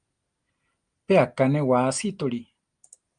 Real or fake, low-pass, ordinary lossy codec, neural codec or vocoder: real; 9.9 kHz; Opus, 32 kbps; none